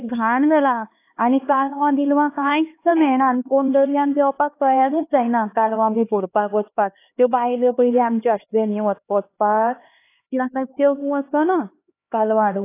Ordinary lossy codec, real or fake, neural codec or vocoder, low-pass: AAC, 24 kbps; fake; codec, 16 kHz, 2 kbps, X-Codec, HuBERT features, trained on LibriSpeech; 3.6 kHz